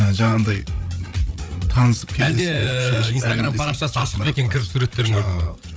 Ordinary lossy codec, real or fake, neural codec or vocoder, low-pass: none; fake; codec, 16 kHz, 8 kbps, FreqCodec, larger model; none